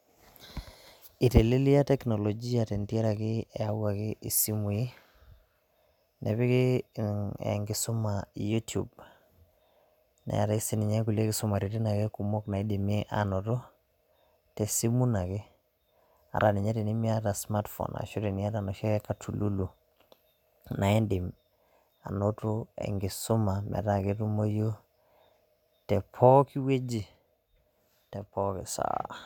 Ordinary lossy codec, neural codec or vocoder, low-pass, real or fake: none; none; 19.8 kHz; real